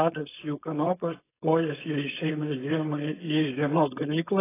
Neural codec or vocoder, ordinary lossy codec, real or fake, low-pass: codec, 16 kHz, 4.8 kbps, FACodec; AAC, 16 kbps; fake; 3.6 kHz